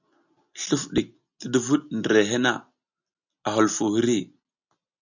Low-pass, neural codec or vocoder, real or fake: 7.2 kHz; none; real